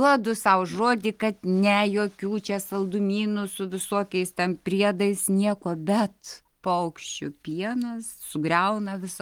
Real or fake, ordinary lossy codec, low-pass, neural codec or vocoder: real; Opus, 24 kbps; 19.8 kHz; none